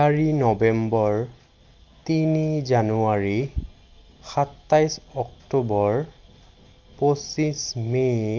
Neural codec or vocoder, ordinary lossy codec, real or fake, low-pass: none; Opus, 32 kbps; real; 7.2 kHz